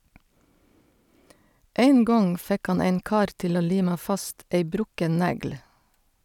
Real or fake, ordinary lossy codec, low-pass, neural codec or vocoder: real; none; 19.8 kHz; none